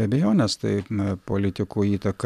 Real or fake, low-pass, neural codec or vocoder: real; 14.4 kHz; none